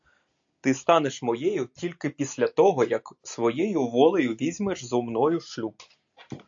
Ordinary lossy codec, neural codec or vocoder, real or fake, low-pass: AAC, 64 kbps; none; real; 7.2 kHz